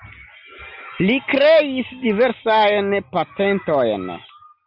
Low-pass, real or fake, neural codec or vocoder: 5.4 kHz; real; none